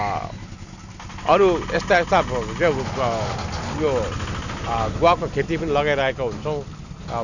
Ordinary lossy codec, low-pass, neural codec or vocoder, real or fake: none; 7.2 kHz; none; real